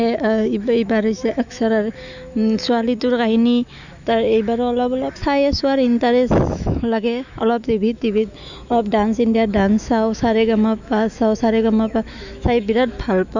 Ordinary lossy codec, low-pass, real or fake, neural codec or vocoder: none; 7.2 kHz; fake; autoencoder, 48 kHz, 128 numbers a frame, DAC-VAE, trained on Japanese speech